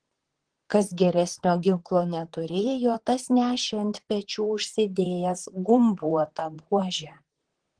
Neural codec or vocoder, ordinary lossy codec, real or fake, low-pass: vocoder, 44.1 kHz, 128 mel bands, Pupu-Vocoder; Opus, 16 kbps; fake; 9.9 kHz